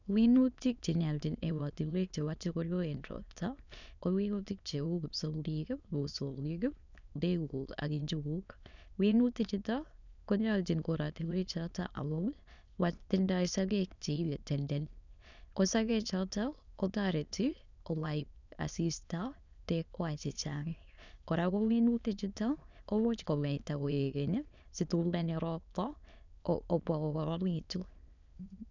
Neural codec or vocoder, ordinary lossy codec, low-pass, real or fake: autoencoder, 22.05 kHz, a latent of 192 numbers a frame, VITS, trained on many speakers; none; 7.2 kHz; fake